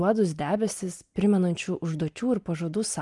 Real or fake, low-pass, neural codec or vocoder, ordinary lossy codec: real; 10.8 kHz; none; Opus, 32 kbps